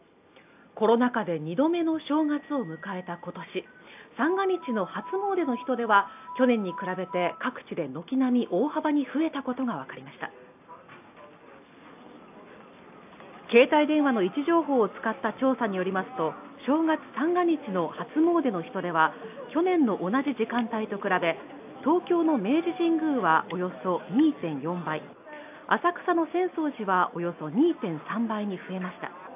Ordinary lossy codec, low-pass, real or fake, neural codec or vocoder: AAC, 32 kbps; 3.6 kHz; real; none